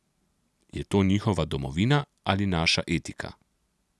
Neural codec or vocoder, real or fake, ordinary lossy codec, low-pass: none; real; none; none